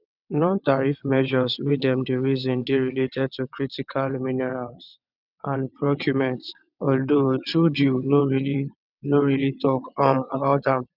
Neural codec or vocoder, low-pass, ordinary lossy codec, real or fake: vocoder, 22.05 kHz, 80 mel bands, WaveNeXt; 5.4 kHz; none; fake